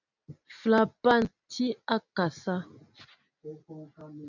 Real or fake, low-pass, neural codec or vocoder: real; 7.2 kHz; none